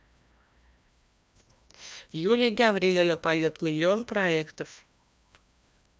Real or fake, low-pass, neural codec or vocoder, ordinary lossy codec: fake; none; codec, 16 kHz, 1 kbps, FreqCodec, larger model; none